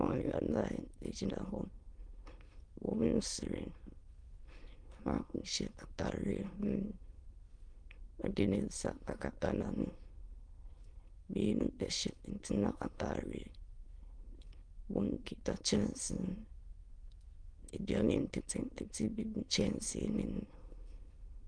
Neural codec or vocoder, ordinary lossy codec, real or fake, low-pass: autoencoder, 22.05 kHz, a latent of 192 numbers a frame, VITS, trained on many speakers; Opus, 24 kbps; fake; 9.9 kHz